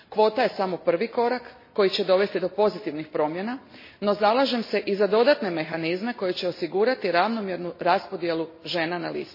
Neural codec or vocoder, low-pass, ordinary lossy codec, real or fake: none; 5.4 kHz; MP3, 24 kbps; real